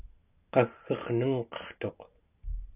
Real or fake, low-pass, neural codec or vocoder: real; 3.6 kHz; none